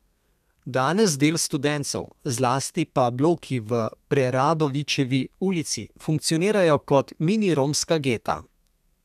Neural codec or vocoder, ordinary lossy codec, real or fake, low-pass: codec, 32 kHz, 1.9 kbps, SNAC; none; fake; 14.4 kHz